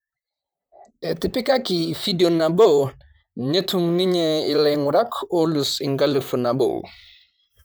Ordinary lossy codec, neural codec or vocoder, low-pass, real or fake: none; vocoder, 44.1 kHz, 128 mel bands, Pupu-Vocoder; none; fake